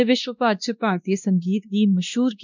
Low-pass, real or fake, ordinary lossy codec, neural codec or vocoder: 7.2 kHz; fake; none; codec, 16 kHz, 1 kbps, X-Codec, WavLM features, trained on Multilingual LibriSpeech